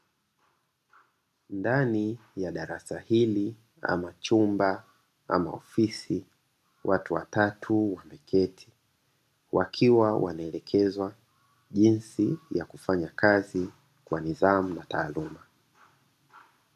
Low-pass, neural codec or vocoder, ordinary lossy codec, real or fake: 14.4 kHz; none; AAC, 96 kbps; real